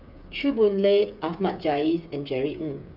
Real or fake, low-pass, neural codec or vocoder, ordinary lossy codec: fake; 5.4 kHz; codec, 16 kHz, 8 kbps, FreqCodec, smaller model; none